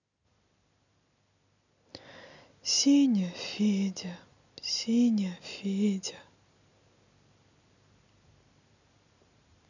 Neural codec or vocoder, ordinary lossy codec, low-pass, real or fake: none; none; 7.2 kHz; real